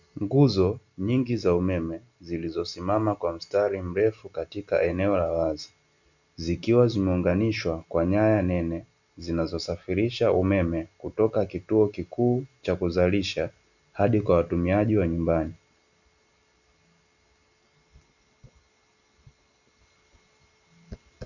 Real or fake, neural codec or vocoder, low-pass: real; none; 7.2 kHz